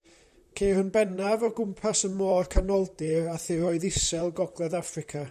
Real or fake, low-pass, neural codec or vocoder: fake; 14.4 kHz; vocoder, 44.1 kHz, 128 mel bands, Pupu-Vocoder